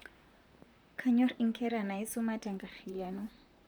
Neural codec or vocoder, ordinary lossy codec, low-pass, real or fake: vocoder, 44.1 kHz, 128 mel bands, Pupu-Vocoder; none; none; fake